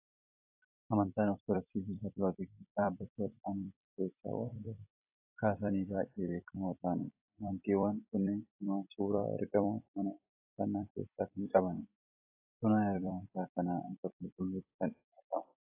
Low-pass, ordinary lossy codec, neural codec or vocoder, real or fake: 3.6 kHz; AAC, 24 kbps; vocoder, 44.1 kHz, 128 mel bands every 256 samples, BigVGAN v2; fake